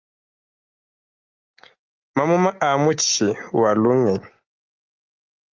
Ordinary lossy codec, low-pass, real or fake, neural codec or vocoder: Opus, 24 kbps; 7.2 kHz; real; none